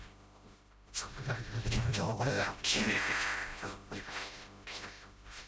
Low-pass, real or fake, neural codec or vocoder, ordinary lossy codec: none; fake; codec, 16 kHz, 0.5 kbps, FreqCodec, smaller model; none